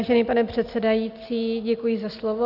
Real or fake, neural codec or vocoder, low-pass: real; none; 5.4 kHz